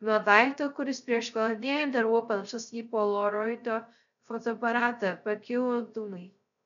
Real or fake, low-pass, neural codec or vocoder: fake; 7.2 kHz; codec, 16 kHz, 0.3 kbps, FocalCodec